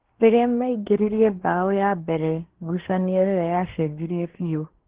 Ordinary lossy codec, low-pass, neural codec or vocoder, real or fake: Opus, 16 kbps; 3.6 kHz; codec, 24 kHz, 1 kbps, SNAC; fake